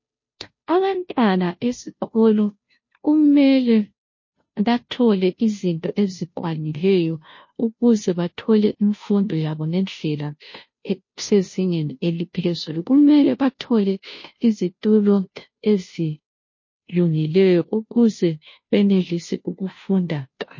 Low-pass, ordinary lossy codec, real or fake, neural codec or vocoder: 7.2 kHz; MP3, 32 kbps; fake; codec, 16 kHz, 0.5 kbps, FunCodec, trained on Chinese and English, 25 frames a second